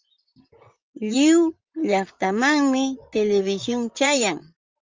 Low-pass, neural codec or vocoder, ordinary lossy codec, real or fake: 7.2 kHz; vocoder, 44.1 kHz, 128 mel bands, Pupu-Vocoder; Opus, 24 kbps; fake